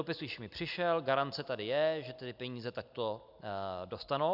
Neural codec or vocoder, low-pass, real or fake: none; 5.4 kHz; real